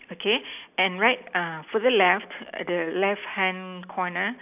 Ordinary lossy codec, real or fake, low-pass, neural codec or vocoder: none; real; 3.6 kHz; none